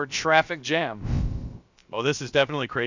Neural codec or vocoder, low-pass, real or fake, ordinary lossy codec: codec, 16 kHz, about 1 kbps, DyCAST, with the encoder's durations; 7.2 kHz; fake; Opus, 64 kbps